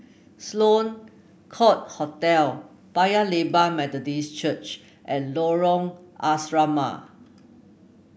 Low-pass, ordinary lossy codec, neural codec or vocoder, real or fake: none; none; none; real